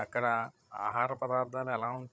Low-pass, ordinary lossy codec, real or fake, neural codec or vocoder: none; none; fake; codec, 16 kHz, 16 kbps, FreqCodec, larger model